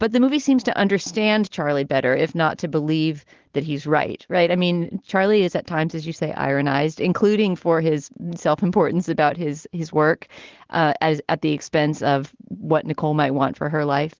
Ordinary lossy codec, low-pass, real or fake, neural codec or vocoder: Opus, 16 kbps; 7.2 kHz; real; none